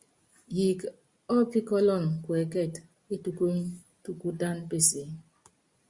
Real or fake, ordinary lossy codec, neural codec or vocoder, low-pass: real; Opus, 64 kbps; none; 10.8 kHz